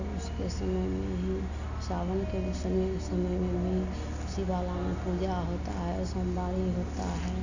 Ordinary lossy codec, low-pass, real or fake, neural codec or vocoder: none; 7.2 kHz; real; none